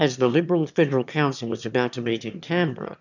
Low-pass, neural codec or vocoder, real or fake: 7.2 kHz; autoencoder, 22.05 kHz, a latent of 192 numbers a frame, VITS, trained on one speaker; fake